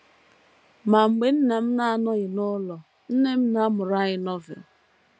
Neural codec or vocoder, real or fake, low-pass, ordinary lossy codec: none; real; none; none